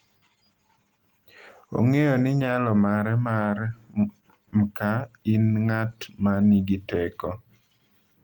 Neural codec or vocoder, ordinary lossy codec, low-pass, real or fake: none; Opus, 24 kbps; 19.8 kHz; real